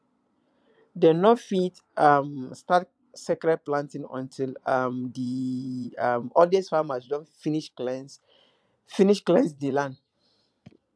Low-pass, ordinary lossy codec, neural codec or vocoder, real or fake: none; none; vocoder, 22.05 kHz, 80 mel bands, Vocos; fake